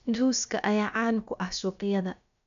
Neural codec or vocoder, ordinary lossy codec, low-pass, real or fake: codec, 16 kHz, about 1 kbps, DyCAST, with the encoder's durations; none; 7.2 kHz; fake